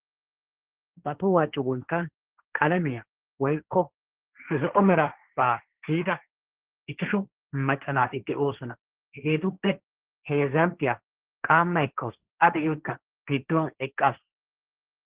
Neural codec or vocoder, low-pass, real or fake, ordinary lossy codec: codec, 16 kHz, 1.1 kbps, Voila-Tokenizer; 3.6 kHz; fake; Opus, 16 kbps